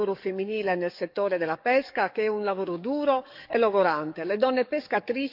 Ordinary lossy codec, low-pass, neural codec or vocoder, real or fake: none; 5.4 kHz; codec, 44.1 kHz, 7.8 kbps, DAC; fake